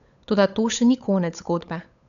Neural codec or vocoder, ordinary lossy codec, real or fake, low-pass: codec, 16 kHz, 8 kbps, FunCodec, trained on Chinese and English, 25 frames a second; none; fake; 7.2 kHz